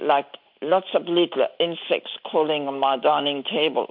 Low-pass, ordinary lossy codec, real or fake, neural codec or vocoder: 5.4 kHz; MP3, 48 kbps; real; none